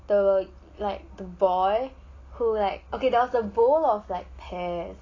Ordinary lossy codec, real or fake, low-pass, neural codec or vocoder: AAC, 32 kbps; real; 7.2 kHz; none